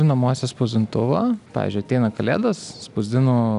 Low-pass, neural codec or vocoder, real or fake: 10.8 kHz; none; real